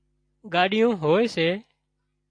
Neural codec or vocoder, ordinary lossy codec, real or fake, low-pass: none; AAC, 48 kbps; real; 9.9 kHz